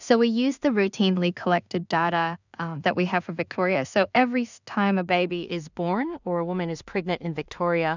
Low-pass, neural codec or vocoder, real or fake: 7.2 kHz; codec, 16 kHz in and 24 kHz out, 0.4 kbps, LongCat-Audio-Codec, two codebook decoder; fake